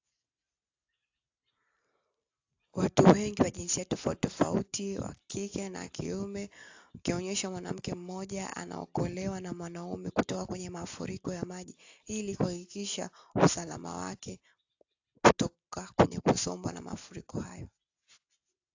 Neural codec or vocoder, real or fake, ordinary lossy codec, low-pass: none; real; AAC, 48 kbps; 7.2 kHz